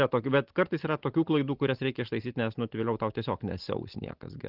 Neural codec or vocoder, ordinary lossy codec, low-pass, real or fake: none; Opus, 16 kbps; 5.4 kHz; real